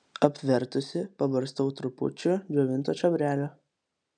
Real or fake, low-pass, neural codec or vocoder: real; 9.9 kHz; none